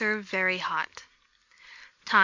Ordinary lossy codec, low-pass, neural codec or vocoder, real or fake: MP3, 64 kbps; 7.2 kHz; none; real